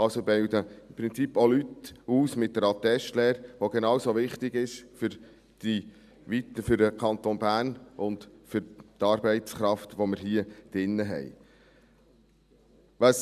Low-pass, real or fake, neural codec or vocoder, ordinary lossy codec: 14.4 kHz; real; none; none